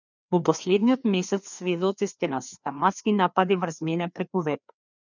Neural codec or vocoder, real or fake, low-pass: codec, 16 kHz, 2 kbps, FreqCodec, larger model; fake; 7.2 kHz